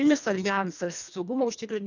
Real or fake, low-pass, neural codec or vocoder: fake; 7.2 kHz; codec, 24 kHz, 1.5 kbps, HILCodec